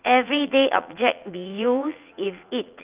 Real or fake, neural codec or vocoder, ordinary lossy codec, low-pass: fake; vocoder, 44.1 kHz, 80 mel bands, Vocos; Opus, 24 kbps; 3.6 kHz